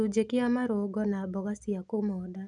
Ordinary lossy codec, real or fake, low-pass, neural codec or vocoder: none; real; 10.8 kHz; none